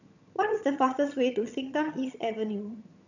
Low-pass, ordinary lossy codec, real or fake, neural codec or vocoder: 7.2 kHz; none; fake; vocoder, 22.05 kHz, 80 mel bands, HiFi-GAN